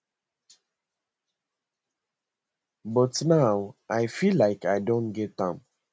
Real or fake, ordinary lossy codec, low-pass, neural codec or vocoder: real; none; none; none